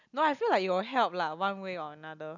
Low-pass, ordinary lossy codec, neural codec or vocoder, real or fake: 7.2 kHz; none; none; real